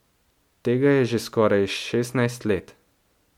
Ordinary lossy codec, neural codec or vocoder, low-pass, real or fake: MP3, 96 kbps; none; 19.8 kHz; real